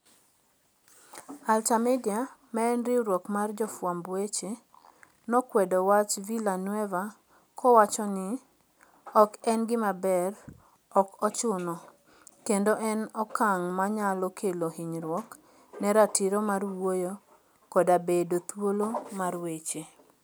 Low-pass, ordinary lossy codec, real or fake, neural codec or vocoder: none; none; real; none